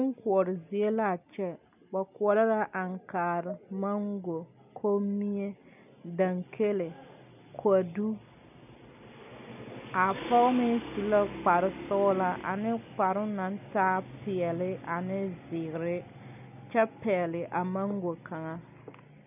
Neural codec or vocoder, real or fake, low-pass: none; real; 3.6 kHz